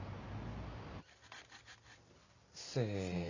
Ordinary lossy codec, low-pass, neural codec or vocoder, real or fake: none; 7.2 kHz; none; real